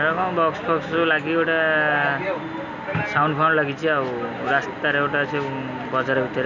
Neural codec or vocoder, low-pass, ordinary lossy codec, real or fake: none; 7.2 kHz; none; real